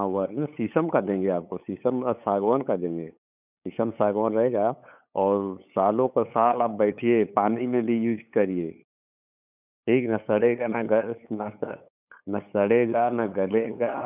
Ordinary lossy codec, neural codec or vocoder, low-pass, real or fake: none; codec, 16 kHz, 8 kbps, FunCodec, trained on LibriTTS, 25 frames a second; 3.6 kHz; fake